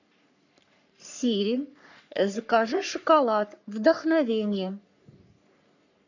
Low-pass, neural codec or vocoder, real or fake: 7.2 kHz; codec, 44.1 kHz, 3.4 kbps, Pupu-Codec; fake